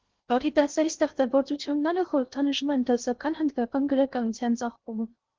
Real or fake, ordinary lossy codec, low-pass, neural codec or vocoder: fake; Opus, 16 kbps; 7.2 kHz; codec, 16 kHz in and 24 kHz out, 0.6 kbps, FocalCodec, streaming, 2048 codes